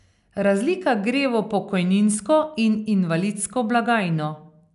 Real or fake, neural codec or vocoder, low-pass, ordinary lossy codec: real; none; 10.8 kHz; none